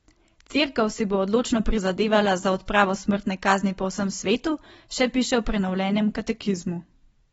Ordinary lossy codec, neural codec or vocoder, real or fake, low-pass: AAC, 24 kbps; none; real; 19.8 kHz